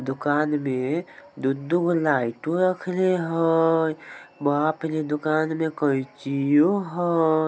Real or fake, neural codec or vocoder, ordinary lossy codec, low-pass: real; none; none; none